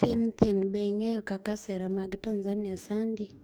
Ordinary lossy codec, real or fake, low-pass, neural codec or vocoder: none; fake; none; codec, 44.1 kHz, 2.6 kbps, DAC